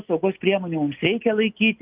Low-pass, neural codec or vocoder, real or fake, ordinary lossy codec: 3.6 kHz; none; real; Opus, 24 kbps